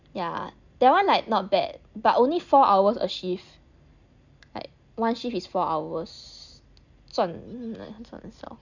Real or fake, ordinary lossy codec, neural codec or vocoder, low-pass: real; none; none; 7.2 kHz